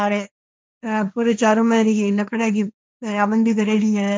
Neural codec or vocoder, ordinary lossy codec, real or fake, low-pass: codec, 16 kHz, 1.1 kbps, Voila-Tokenizer; none; fake; none